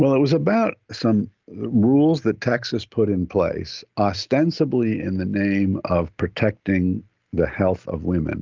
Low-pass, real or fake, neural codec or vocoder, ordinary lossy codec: 7.2 kHz; real; none; Opus, 32 kbps